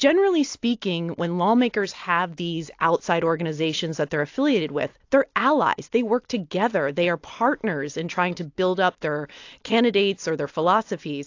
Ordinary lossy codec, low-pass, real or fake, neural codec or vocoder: AAC, 48 kbps; 7.2 kHz; real; none